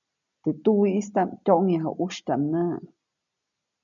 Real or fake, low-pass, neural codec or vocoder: real; 7.2 kHz; none